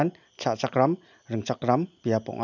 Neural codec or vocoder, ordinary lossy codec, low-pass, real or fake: none; none; 7.2 kHz; real